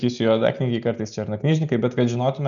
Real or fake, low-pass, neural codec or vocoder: real; 7.2 kHz; none